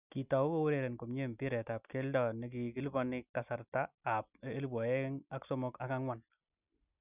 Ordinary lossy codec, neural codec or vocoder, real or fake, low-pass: none; none; real; 3.6 kHz